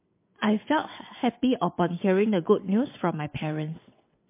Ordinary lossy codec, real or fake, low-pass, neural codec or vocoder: MP3, 24 kbps; fake; 3.6 kHz; vocoder, 22.05 kHz, 80 mel bands, WaveNeXt